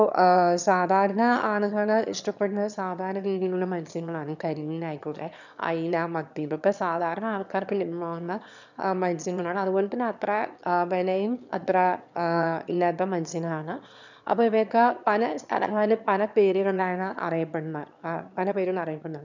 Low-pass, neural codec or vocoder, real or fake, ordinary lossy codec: 7.2 kHz; autoencoder, 22.05 kHz, a latent of 192 numbers a frame, VITS, trained on one speaker; fake; none